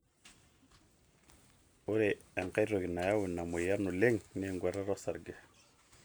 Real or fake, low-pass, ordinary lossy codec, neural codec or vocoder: real; none; none; none